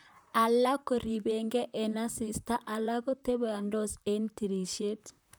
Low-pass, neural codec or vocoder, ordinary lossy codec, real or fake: none; vocoder, 44.1 kHz, 128 mel bands, Pupu-Vocoder; none; fake